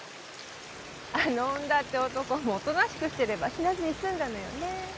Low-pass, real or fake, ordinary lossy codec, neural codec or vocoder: none; real; none; none